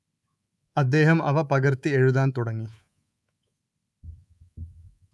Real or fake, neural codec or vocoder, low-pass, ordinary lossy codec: fake; codec, 24 kHz, 3.1 kbps, DualCodec; none; none